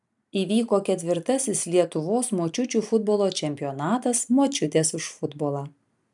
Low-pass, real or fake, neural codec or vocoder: 10.8 kHz; real; none